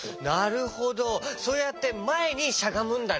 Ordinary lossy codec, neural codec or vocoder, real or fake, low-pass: none; none; real; none